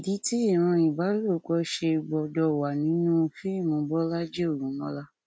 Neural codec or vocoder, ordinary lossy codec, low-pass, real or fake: none; none; none; real